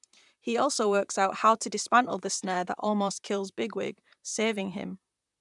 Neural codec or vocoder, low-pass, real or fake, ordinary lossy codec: vocoder, 44.1 kHz, 128 mel bands, Pupu-Vocoder; 10.8 kHz; fake; none